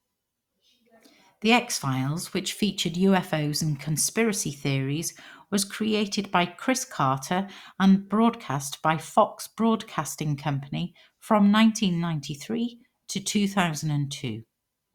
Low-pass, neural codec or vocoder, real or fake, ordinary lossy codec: 19.8 kHz; none; real; Opus, 64 kbps